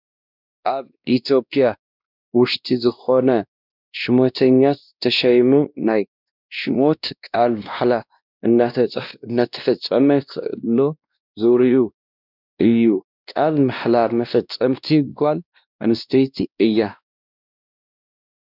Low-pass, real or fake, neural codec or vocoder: 5.4 kHz; fake; codec, 16 kHz, 1 kbps, X-Codec, WavLM features, trained on Multilingual LibriSpeech